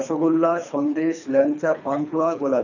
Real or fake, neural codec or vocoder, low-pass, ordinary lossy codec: fake; codec, 24 kHz, 3 kbps, HILCodec; 7.2 kHz; none